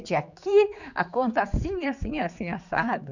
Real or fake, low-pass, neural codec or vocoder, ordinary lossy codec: fake; 7.2 kHz; codec, 16 kHz, 4 kbps, X-Codec, HuBERT features, trained on general audio; none